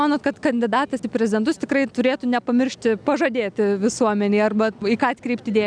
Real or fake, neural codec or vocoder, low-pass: real; none; 9.9 kHz